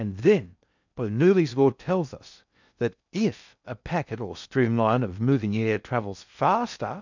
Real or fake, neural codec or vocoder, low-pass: fake; codec, 16 kHz in and 24 kHz out, 0.6 kbps, FocalCodec, streaming, 2048 codes; 7.2 kHz